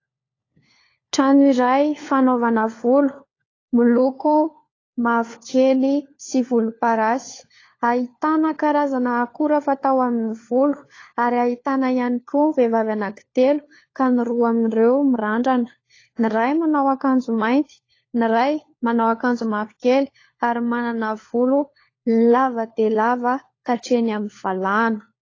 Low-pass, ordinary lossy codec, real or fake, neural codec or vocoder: 7.2 kHz; AAC, 32 kbps; fake; codec, 16 kHz, 4 kbps, FunCodec, trained on LibriTTS, 50 frames a second